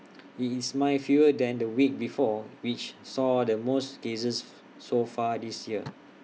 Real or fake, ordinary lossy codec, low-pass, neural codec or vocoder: real; none; none; none